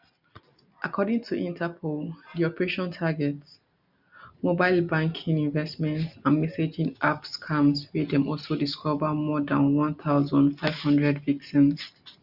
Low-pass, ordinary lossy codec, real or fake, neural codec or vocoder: 5.4 kHz; none; real; none